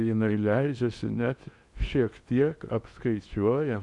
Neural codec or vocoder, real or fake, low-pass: codec, 16 kHz in and 24 kHz out, 0.8 kbps, FocalCodec, streaming, 65536 codes; fake; 10.8 kHz